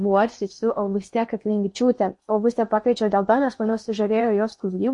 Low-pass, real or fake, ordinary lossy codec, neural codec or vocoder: 10.8 kHz; fake; MP3, 48 kbps; codec, 16 kHz in and 24 kHz out, 0.8 kbps, FocalCodec, streaming, 65536 codes